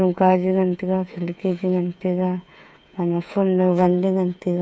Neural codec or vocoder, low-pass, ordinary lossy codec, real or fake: codec, 16 kHz, 8 kbps, FreqCodec, smaller model; none; none; fake